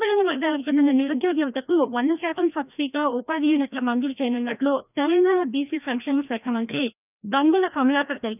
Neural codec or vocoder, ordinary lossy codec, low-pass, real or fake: codec, 16 kHz, 1 kbps, FreqCodec, larger model; none; 3.6 kHz; fake